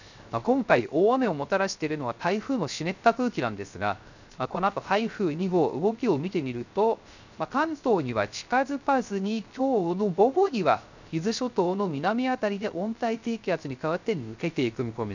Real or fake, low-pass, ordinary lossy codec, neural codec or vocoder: fake; 7.2 kHz; none; codec, 16 kHz, 0.3 kbps, FocalCodec